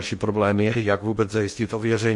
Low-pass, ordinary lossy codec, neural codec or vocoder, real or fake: 10.8 kHz; MP3, 48 kbps; codec, 16 kHz in and 24 kHz out, 0.8 kbps, FocalCodec, streaming, 65536 codes; fake